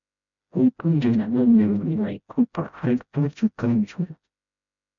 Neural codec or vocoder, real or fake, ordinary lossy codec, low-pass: codec, 16 kHz, 0.5 kbps, FreqCodec, smaller model; fake; MP3, 64 kbps; 7.2 kHz